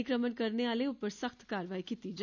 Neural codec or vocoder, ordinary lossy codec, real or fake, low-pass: none; none; real; 7.2 kHz